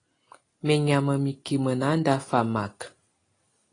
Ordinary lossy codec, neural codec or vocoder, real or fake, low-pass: AAC, 32 kbps; none; real; 9.9 kHz